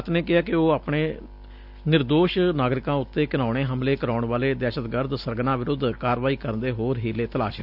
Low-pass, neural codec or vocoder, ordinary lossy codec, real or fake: 5.4 kHz; none; none; real